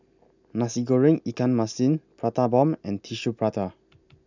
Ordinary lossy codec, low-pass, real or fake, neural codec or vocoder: none; 7.2 kHz; real; none